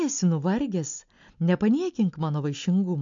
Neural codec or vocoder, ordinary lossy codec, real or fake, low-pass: none; AAC, 64 kbps; real; 7.2 kHz